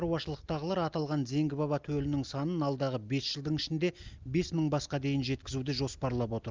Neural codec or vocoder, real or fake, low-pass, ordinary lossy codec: none; real; 7.2 kHz; Opus, 24 kbps